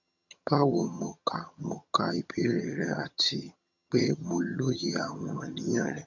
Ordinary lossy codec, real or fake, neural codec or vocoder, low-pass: none; fake; vocoder, 22.05 kHz, 80 mel bands, HiFi-GAN; 7.2 kHz